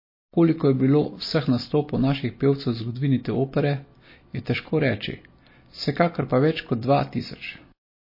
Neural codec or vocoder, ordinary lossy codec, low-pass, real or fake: none; MP3, 24 kbps; 5.4 kHz; real